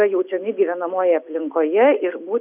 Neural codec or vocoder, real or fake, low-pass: none; real; 3.6 kHz